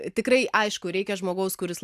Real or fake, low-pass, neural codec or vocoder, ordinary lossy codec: real; 14.4 kHz; none; AAC, 96 kbps